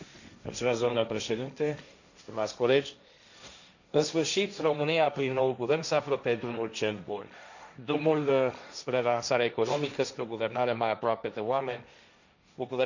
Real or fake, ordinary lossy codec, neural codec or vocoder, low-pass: fake; none; codec, 16 kHz, 1.1 kbps, Voila-Tokenizer; 7.2 kHz